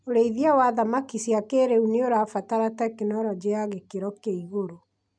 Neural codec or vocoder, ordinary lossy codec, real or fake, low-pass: none; MP3, 96 kbps; real; 9.9 kHz